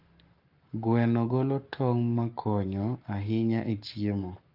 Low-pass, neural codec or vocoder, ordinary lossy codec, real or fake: 5.4 kHz; codec, 44.1 kHz, 7.8 kbps, Pupu-Codec; Opus, 32 kbps; fake